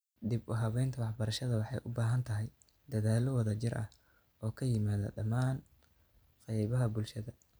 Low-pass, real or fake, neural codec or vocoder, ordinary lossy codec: none; real; none; none